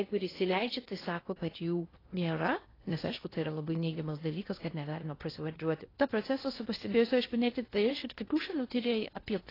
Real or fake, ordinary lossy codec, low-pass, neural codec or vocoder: fake; AAC, 24 kbps; 5.4 kHz; codec, 16 kHz in and 24 kHz out, 0.6 kbps, FocalCodec, streaming, 4096 codes